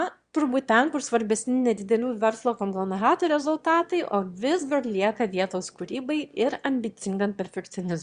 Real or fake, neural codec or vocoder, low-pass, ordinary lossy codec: fake; autoencoder, 22.05 kHz, a latent of 192 numbers a frame, VITS, trained on one speaker; 9.9 kHz; Opus, 64 kbps